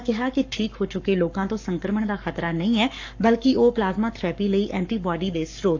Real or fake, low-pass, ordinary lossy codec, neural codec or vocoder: fake; 7.2 kHz; AAC, 48 kbps; codec, 44.1 kHz, 7.8 kbps, Pupu-Codec